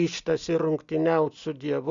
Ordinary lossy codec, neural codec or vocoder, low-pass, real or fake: Opus, 64 kbps; none; 7.2 kHz; real